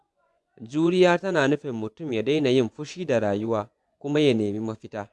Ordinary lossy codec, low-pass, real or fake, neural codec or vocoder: none; none; fake; vocoder, 24 kHz, 100 mel bands, Vocos